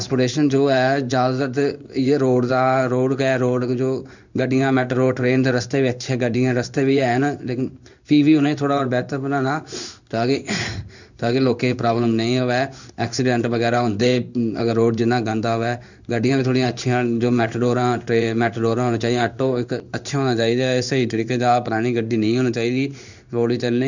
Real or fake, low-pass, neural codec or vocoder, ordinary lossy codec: fake; 7.2 kHz; codec, 16 kHz in and 24 kHz out, 1 kbps, XY-Tokenizer; none